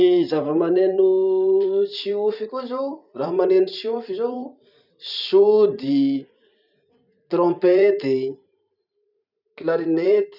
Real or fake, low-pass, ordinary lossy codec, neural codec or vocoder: fake; 5.4 kHz; none; vocoder, 44.1 kHz, 128 mel bands every 512 samples, BigVGAN v2